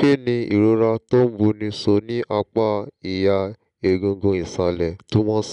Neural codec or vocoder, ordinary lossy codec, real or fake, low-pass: none; none; real; 10.8 kHz